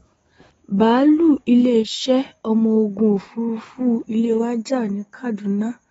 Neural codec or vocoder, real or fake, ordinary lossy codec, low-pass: autoencoder, 48 kHz, 128 numbers a frame, DAC-VAE, trained on Japanese speech; fake; AAC, 24 kbps; 19.8 kHz